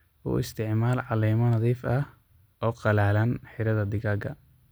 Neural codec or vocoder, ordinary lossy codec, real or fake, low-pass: none; none; real; none